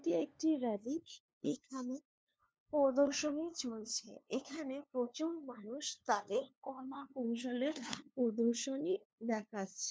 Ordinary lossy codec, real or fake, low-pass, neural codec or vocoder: none; fake; none; codec, 16 kHz, 2 kbps, FunCodec, trained on LibriTTS, 25 frames a second